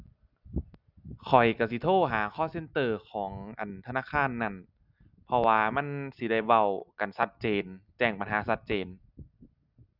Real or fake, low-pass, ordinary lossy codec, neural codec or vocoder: real; 5.4 kHz; none; none